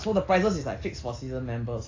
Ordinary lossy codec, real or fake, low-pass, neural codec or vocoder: AAC, 32 kbps; real; 7.2 kHz; none